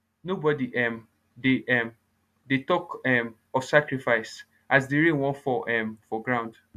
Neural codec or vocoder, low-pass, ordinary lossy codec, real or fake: none; 14.4 kHz; AAC, 96 kbps; real